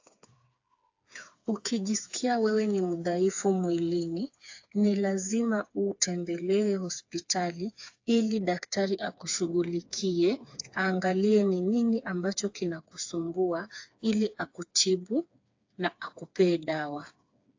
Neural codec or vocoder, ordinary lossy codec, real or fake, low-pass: codec, 16 kHz, 4 kbps, FreqCodec, smaller model; AAC, 48 kbps; fake; 7.2 kHz